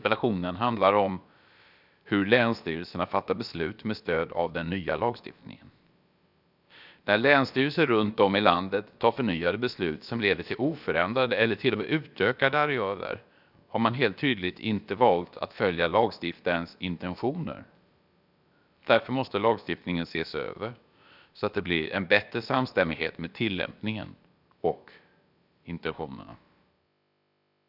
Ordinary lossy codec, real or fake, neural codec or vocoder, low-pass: none; fake; codec, 16 kHz, about 1 kbps, DyCAST, with the encoder's durations; 5.4 kHz